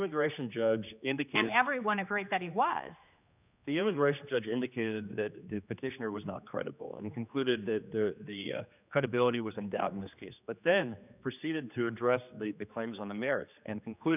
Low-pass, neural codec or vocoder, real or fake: 3.6 kHz; codec, 16 kHz, 2 kbps, X-Codec, HuBERT features, trained on general audio; fake